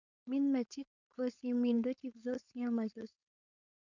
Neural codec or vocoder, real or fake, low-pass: codec, 16 kHz, 4.8 kbps, FACodec; fake; 7.2 kHz